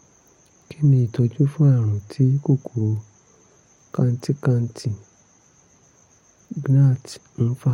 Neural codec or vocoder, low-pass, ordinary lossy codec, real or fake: none; 19.8 kHz; MP3, 64 kbps; real